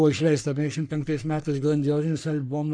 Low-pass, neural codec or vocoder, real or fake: 9.9 kHz; codec, 44.1 kHz, 3.4 kbps, Pupu-Codec; fake